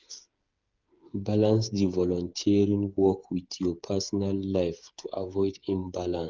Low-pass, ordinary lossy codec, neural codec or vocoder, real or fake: 7.2 kHz; Opus, 24 kbps; codec, 16 kHz, 8 kbps, FreqCodec, smaller model; fake